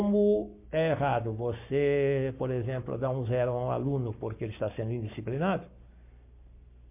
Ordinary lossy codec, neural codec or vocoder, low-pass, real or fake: MP3, 32 kbps; codec, 44.1 kHz, 7.8 kbps, Pupu-Codec; 3.6 kHz; fake